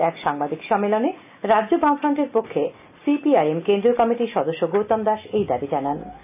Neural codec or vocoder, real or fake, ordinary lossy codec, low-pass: none; real; AAC, 32 kbps; 3.6 kHz